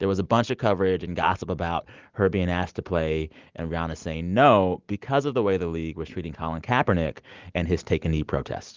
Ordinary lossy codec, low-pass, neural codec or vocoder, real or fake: Opus, 32 kbps; 7.2 kHz; none; real